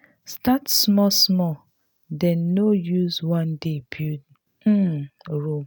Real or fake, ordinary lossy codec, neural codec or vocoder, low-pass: real; none; none; none